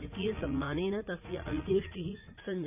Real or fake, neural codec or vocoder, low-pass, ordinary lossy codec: fake; vocoder, 44.1 kHz, 80 mel bands, Vocos; 3.6 kHz; none